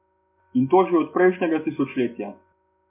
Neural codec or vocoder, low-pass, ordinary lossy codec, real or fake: none; 3.6 kHz; MP3, 32 kbps; real